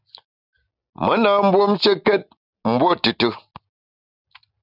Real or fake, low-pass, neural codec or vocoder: real; 5.4 kHz; none